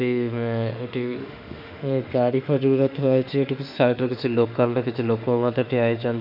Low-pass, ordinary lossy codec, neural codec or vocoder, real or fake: 5.4 kHz; none; autoencoder, 48 kHz, 32 numbers a frame, DAC-VAE, trained on Japanese speech; fake